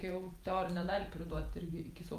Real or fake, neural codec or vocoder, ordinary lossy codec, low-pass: fake; vocoder, 48 kHz, 128 mel bands, Vocos; Opus, 32 kbps; 14.4 kHz